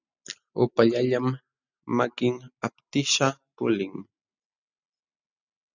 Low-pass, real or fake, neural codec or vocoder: 7.2 kHz; real; none